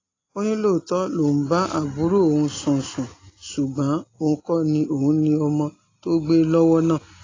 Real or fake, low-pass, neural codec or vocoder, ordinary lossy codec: real; 7.2 kHz; none; AAC, 32 kbps